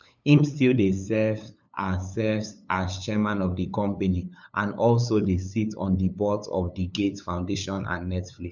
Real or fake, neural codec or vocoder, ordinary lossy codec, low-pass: fake; codec, 16 kHz, 8 kbps, FunCodec, trained on LibriTTS, 25 frames a second; none; 7.2 kHz